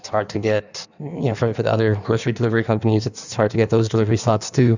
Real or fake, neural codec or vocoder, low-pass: fake; codec, 16 kHz in and 24 kHz out, 1.1 kbps, FireRedTTS-2 codec; 7.2 kHz